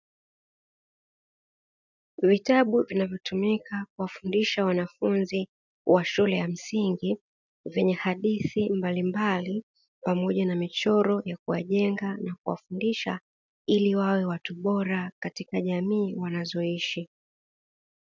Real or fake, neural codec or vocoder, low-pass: real; none; 7.2 kHz